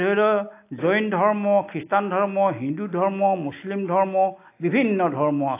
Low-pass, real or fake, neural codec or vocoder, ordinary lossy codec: 3.6 kHz; real; none; AAC, 24 kbps